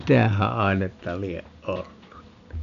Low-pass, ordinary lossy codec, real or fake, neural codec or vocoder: 7.2 kHz; none; real; none